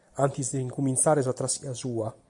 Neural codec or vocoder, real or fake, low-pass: none; real; 10.8 kHz